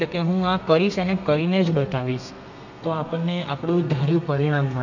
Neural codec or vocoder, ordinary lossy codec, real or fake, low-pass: codec, 32 kHz, 1.9 kbps, SNAC; none; fake; 7.2 kHz